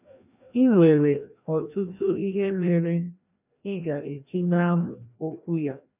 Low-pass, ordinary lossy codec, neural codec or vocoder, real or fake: 3.6 kHz; none; codec, 16 kHz, 1 kbps, FreqCodec, larger model; fake